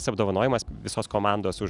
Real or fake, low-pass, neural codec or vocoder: real; 10.8 kHz; none